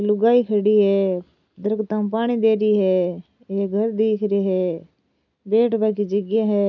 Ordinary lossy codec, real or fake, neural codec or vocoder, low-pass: none; real; none; 7.2 kHz